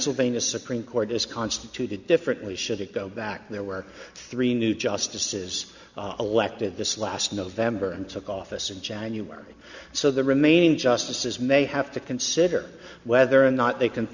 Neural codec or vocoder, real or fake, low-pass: none; real; 7.2 kHz